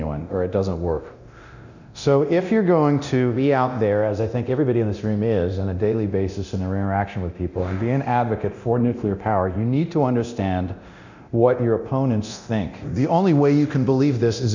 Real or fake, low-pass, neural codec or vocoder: fake; 7.2 kHz; codec, 24 kHz, 0.9 kbps, DualCodec